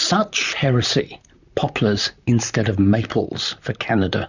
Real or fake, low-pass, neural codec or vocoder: fake; 7.2 kHz; vocoder, 44.1 kHz, 128 mel bands, Pupu-Vocoder